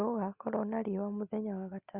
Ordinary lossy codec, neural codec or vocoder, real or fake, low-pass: Opus, 64 kbps; none; real; 3.6 kHz